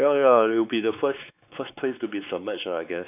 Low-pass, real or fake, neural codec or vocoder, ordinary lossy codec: 3.6 kHz; fake; codec, 16 kHz, 4 kbps, X-Codec, WavLM features, trained on Multilingual LibriSpeech; none